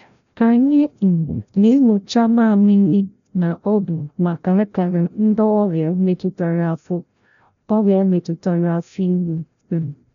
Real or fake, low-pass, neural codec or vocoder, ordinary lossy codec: fake; 7.2 kHz; codec, 16 kHz, 0.5 kbps, FreqCodec, larger model; none